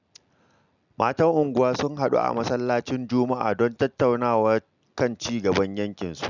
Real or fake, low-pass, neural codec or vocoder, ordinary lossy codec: real; 7.2 kHz; none; none